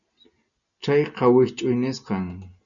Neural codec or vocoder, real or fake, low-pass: none; real; 7.2 kHz